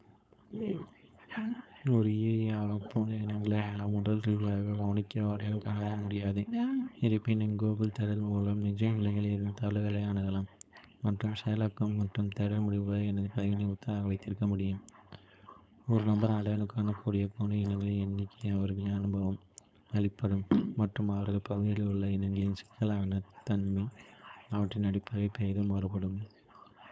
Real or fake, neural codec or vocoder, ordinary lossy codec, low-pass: fake; codec, 16 kHz, 4.8 kbps, FACodec; none; none